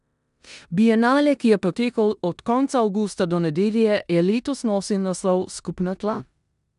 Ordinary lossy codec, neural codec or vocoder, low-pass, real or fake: none; codec, 16 kHz in and 24 kHz out, 0.9 kbps, LongCat-Audio-Codec, four codebook decoder; 10.8 kHz; fake